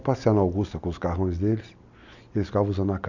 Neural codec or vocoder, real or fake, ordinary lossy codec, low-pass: none; real; none; 7.2 kHz